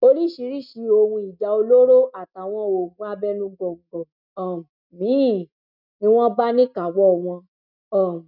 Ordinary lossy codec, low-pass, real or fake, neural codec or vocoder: none; 5.4 kHz; real; none